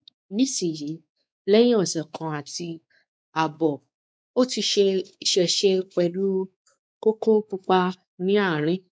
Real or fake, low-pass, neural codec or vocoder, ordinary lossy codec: fake; none; codec, 16 kHz, 4 kbps, X-Codec, WavLM features, trained on Multilingual LibriSpeech; none